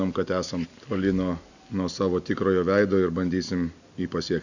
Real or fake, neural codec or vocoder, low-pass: real; none; 7.2 kHz